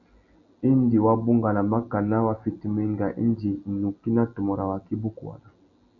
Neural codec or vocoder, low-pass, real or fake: none; 7.2 kHz; real